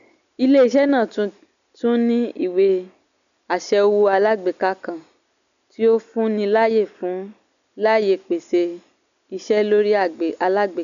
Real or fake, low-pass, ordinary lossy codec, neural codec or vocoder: real; 7.2 kHz; none; none